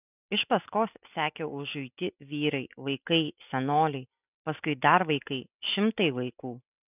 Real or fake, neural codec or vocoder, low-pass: real; none; 3.6 kHz